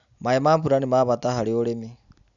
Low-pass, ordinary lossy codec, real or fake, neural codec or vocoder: 7.2 kHz; none; real; none